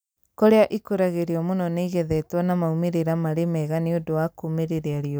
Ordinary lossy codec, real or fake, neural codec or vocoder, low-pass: none; real; none; none